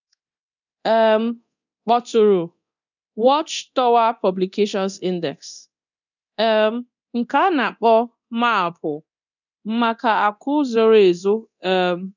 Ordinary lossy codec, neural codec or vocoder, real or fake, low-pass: none; codec, 24 kHz, 0.9 kbps, DualCodec; fake; 7.2 kHz